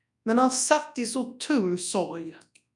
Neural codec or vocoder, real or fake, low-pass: codec, 24 kHz, 0.9 kbps, WavTokenizer, large speech release; fake; 10.8 kHz